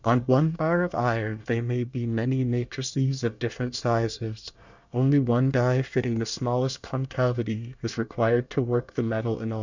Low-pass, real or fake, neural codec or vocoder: 7.2 kHz; fake; codec, 24 kHz, 1 kbps, SNAC